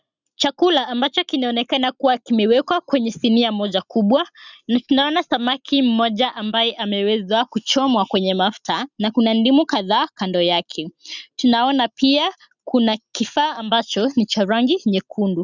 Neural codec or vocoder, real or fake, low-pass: none; real; 7.2 kHz